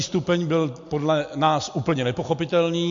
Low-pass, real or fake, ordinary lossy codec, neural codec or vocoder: 7.2 kHz; real; MP3, 48 kbps; none